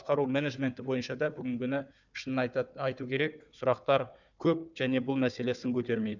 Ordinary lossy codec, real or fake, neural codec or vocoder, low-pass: none; fake; codec, 16 kHz, 4 kbps, FunCodec, trained on Chinese and English, 50 frames a second; 7.2 kHz